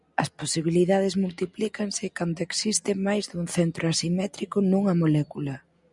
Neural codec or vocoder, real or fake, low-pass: none; real; 10.8 kHz